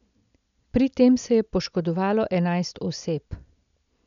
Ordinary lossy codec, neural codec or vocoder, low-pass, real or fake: MP3, 96 kbps; none; 7.2 kHz; real